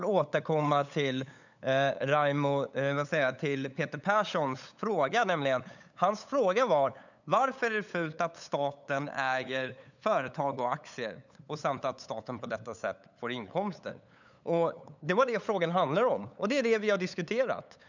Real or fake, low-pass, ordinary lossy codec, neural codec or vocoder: fake; 7.2 kHz; none; codec, 16 kHz, 8 kbps, FunCodec, trained on LibriTTS, 25 frames a second